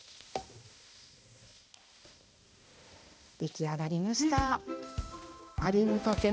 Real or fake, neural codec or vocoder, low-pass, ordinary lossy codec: fake; codec, 16 kHz, 1 kbps, X-Codec, HuBERT features, trained on balanced general audio; none; none